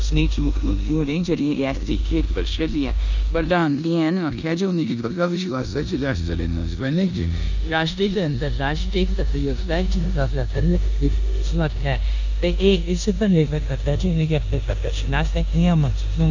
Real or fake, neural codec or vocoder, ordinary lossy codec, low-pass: fake; codec, 16 kHz in and 24 kHz out, 0.9 kbps, LongCat-Audio-Codec, four codebook decoder; none; 7.2 kHz